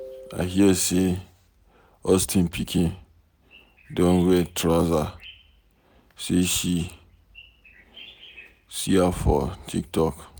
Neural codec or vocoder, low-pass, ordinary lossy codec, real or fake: none; none; none; real